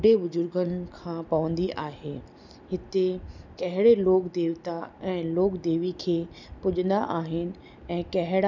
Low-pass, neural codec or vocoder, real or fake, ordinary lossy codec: 7.2 kHz; none; real; none